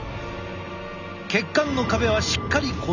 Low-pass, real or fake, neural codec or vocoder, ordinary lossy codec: 7.2 kHz; real; none; none